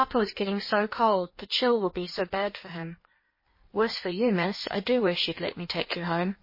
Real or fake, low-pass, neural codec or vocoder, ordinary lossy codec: fake; 5.4 kHz; codec, 16 kHz in and 24 kHz out, 1.1 kbps, FireRedTTS-2 codec; MP3, 24 kbps